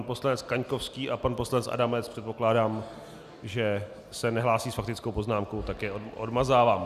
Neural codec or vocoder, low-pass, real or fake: none; 14.4 kHz; real